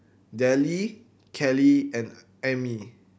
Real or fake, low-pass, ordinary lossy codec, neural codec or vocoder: real; none; none; none